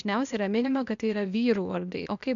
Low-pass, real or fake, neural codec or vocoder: 7.2 kHz; fake; codec, 16 kHz, 0.8 kbps, ZipCodec